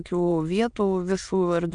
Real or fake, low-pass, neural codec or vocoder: fake; 9.9 kHz; autoencoder, 22.05 kHz, a latent of 192 numbers a frame, VITS, trained on many speakers